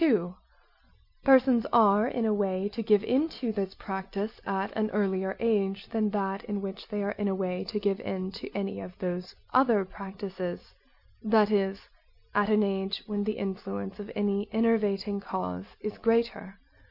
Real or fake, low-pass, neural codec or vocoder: real; 5.4 kHz; none